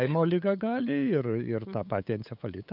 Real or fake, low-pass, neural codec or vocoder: fake; 5.4 kHz; codec, 16 kHz, 8 kbps, FunCodec, trained on LibriTTS, 25 frames a second